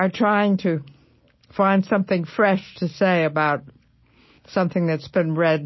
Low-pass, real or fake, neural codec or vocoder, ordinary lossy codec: 7.2 kHz; real; none; MP3, 24 kbps